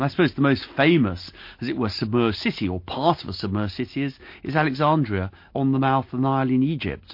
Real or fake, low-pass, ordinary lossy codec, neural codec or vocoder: real; 5.4 kHz; MP3, 32 kbps; none